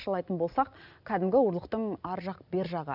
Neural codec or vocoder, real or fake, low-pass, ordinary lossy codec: none; real; 5.4 kHz; none